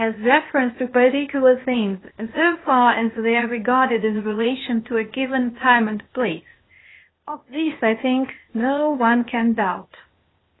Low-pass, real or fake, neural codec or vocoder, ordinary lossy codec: 7.2 kHz; fake; codec, 16 kHz, 0.8 kbps, ZipCodec; AAC, 16 kbps